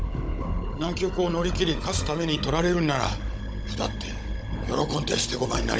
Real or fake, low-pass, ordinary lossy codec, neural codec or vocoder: fake; none; none; codec, 16 kHz, 16 kbps, FunCodec, trained on Chinese and English, 50 frames a second